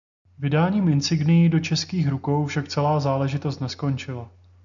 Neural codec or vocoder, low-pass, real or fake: none; 7.2 kHz; real